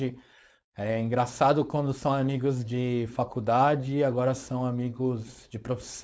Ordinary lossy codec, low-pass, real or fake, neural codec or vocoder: none; none; fake; codec, 16 kHz, 4.8 kbps, FACodec